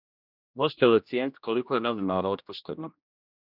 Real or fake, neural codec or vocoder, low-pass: fake; codec, 16 kHz, 1 kbps, X-Codec, HuBERT features, trained on general audio; 5.4 kHz